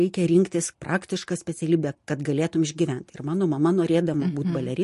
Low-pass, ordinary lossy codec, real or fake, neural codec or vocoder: 14.4 kHz; MP3, 48 kbps; real; none